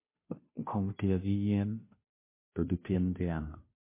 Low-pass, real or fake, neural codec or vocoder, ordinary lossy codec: 3.6 kHz; fake; codec, 16 kHz, 0.5 kbps, FunCodec, trained on Chinese and English, 25 frames a second; MP3, 24 kbps